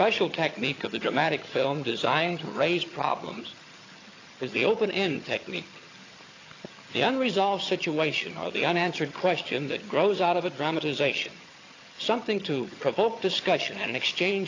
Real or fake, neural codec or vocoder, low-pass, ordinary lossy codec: fake; vocoder, 22.05 kHz, 80 mel bands, HiFi-GAN; 7.2 kHz; AAC, 32 kbps